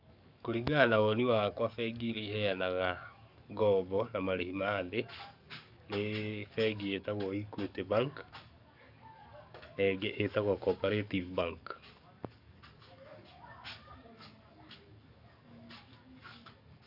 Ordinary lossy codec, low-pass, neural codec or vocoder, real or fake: none; 5.4 kHz; codec, 44.1 kHz, 7.8 kbps, DAC; fake